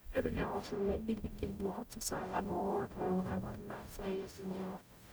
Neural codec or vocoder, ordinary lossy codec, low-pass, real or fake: codec, 44.1 kHz, 0.9 kbps, DAC; none; none; fake